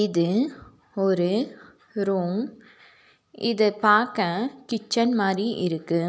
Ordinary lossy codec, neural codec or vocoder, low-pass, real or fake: none; none; none; real